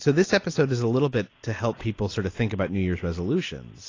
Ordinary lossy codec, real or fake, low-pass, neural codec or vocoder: AAC, 32 kbps; real; 7.2 kHz; none